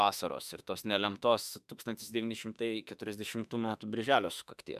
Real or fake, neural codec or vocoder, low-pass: fake; autoencoder, 48 kHz, 32 numbers a frame, DAC-VAE, trained on Japanese speech; 14.4 kHz